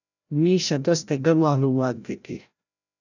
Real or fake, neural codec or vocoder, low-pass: fake; codec, 16 kHz, 0.5 kbps, FreqCodec, larger model; 7.2 kHz